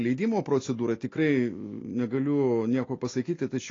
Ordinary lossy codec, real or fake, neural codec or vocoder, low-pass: AAC, 32 kbps; real; none; 7.2 kHz